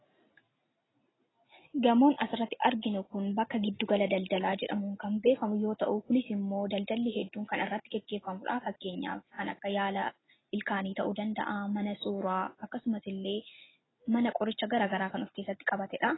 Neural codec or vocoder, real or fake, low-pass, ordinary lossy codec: none; real; 7.2 kHz; AAC, 16 kbps